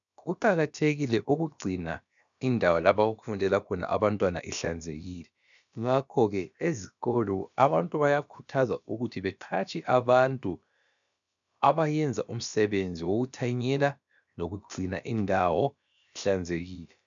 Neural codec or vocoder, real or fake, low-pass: codec, 16 kHz, about 1 kbps, DyCAST, with the encoder's durations; fake; 7.2 kHz